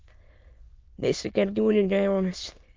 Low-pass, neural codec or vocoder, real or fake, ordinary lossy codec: 7.2 kHz; autoencoder, 22.05 kHz, a latent of 192 numbers a frame, VITS, trained on many speakers; fake; Opus, 32 kbps